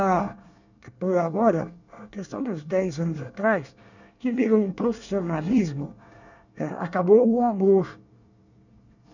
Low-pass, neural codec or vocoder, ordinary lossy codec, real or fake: 7.2 kHz; codec, 24 kHz, 1 kbps, SNAC; none; fake